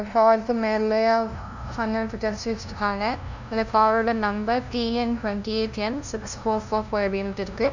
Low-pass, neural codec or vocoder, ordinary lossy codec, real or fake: 7.2 kHz; codec, 16 kHz, 0.5 kbps, FunCodec, trained on LibriTTS, 25 frames a second; none; fake